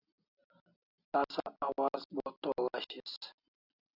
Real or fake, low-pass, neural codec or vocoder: real; 5.4 kHz; none